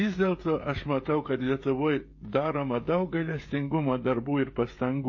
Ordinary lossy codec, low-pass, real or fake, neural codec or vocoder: MP3, 32 kbps; 7.2 kHz; fake; codec, 16 kHz, 16 kbps, FreqCodec, smaller model